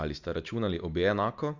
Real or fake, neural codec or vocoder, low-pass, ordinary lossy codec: real; none; 7.2 kHz; Opus, 64 kbps